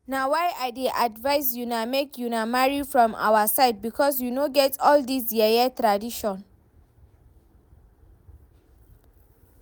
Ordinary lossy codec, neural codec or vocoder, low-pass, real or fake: none; none; none; real